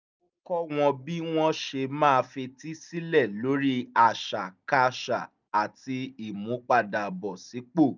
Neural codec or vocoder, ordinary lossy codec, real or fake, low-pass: none; none; real; 7.2 kHz